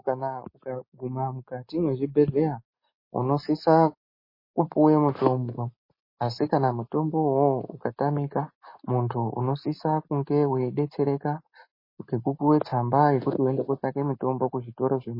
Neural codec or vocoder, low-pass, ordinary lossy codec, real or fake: none; 5.4 kHz; MP3, 24 kbps; real